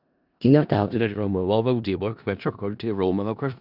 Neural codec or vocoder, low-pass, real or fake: codec, 16 kHz in and 24 kHz out, 0.4 kbps, LongCat-Audio-Codec, four codebook decoder; 5.4 kHz; fake